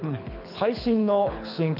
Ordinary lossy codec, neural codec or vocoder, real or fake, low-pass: none; codec, 24 kHz, 6 kbps, HILCodec; fake; 5.4 kHz